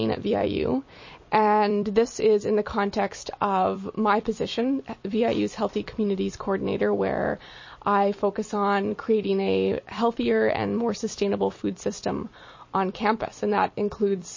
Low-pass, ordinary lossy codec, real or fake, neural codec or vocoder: 7.2 kHz; MP3, 32 kbps; fake; vocoder, 44.1 kHz, 128 mel bands every 256 samples, BigVGAN v2